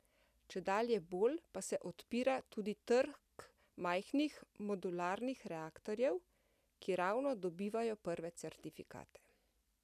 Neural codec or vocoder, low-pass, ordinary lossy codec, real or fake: none; 14.4 kHz; none; real